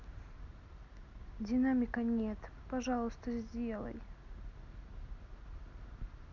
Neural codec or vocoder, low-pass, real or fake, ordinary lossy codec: none; 7.2 kHz; real; none